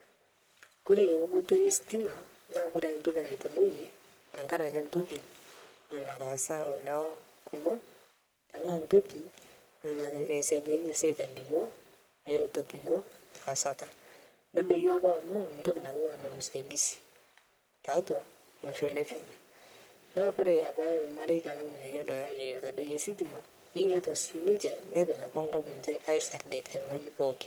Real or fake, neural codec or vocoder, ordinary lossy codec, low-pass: fake; codec, 44.1 kHz, 1.7 kbps, Pupu-Codec; none; none